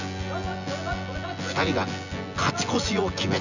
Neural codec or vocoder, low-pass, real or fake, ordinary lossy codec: vocoder, 24 kHz, 100 mel bands, Vocos; 7.2 kHz; fake; none